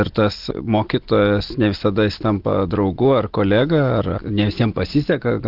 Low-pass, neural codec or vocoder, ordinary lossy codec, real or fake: 5.4 kHz; none; Opus, 16 kbps; real